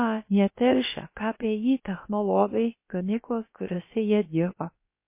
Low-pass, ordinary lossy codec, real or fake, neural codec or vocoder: 3.6 kHz; MP3, 24 kbps; fake; codec, 16 kHz, about 1 kbps, DyCAST, with the encoder's durations